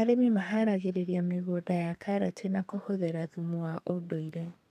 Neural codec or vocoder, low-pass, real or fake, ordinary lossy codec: codec, 32 kHz, 1.9 kbps, SNAC; 14.4 kHz; fake; none